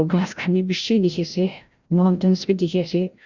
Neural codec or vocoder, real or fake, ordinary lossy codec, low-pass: codec, 16 kHz, 0.5 kbps, FreqCodec, larger model; fake; Opus, 64 kbps; 7.2 kHz